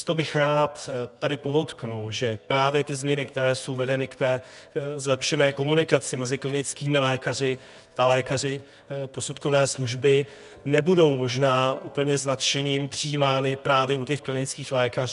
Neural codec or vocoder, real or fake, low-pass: codec, 24 kHz, 0.9 kbps, WavTokenizer, medium music audio release; fake; 10.8 kHz